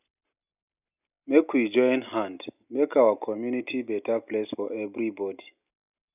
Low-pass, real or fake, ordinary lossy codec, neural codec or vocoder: 3.6 kHz; real; none; none